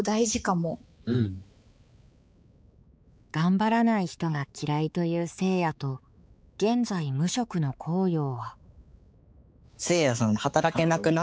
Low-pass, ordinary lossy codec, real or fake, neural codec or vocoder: none; none; fake; codec, 16 kHz, 4 kbps, X-Codec, HuBERT features, trained on balanced general audio